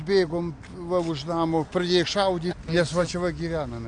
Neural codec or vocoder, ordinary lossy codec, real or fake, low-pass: none; AAC, 64 kbps; real; 9.9 kHz